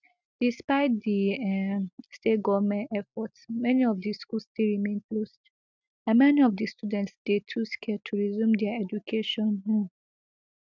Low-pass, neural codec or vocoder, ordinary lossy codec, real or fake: 7.2 kHz; none; none; real